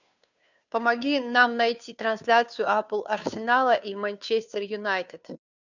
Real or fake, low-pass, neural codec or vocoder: fake; 7.2 kHz; codec, 16 kHz, 2 kbps, FunCodec, trained on Chinese and English, 25 frames a second